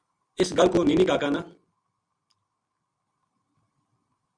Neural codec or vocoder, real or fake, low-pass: none; real; 9.9 kHz